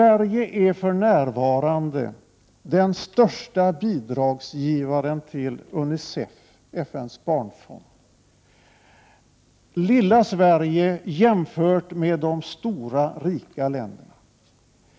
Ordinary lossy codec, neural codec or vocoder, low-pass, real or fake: none; none; none; real